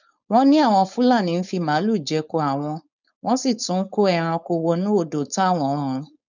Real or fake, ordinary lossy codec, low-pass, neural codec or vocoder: fake; none; 7.2 kHz; codec, 16 kHz, 4.8 kbps, FACodec